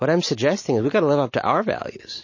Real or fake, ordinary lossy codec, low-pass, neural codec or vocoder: real; MP3, 32 kbps; 7.2 kHz; none